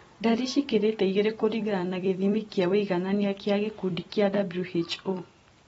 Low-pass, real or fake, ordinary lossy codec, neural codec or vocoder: 19.8 kHz; fake; AAC, 24 kbps; vocoder, 44.1 kHz, 128 mel bands every 256 samples, BigVGAN v2